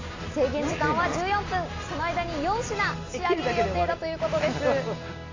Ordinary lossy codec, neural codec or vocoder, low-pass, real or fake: AAC, 32 kbps; none; 7.2 kHz; real